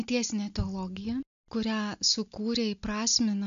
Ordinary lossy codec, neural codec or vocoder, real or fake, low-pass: AAC, 96 kbps; none; real; 7.2 kHz